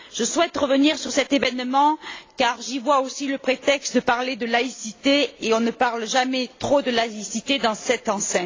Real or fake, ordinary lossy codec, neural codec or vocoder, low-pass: real; AAC, 32 kbps; none; 7.2 kHz